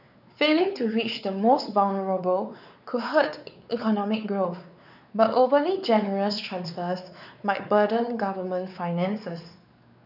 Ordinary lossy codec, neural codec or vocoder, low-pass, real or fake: none; codec, 16 kHz, 4 kbps, X-Codec, WavLM features, trained on Multilingual LibriSpeech; 5.4 kHz; fake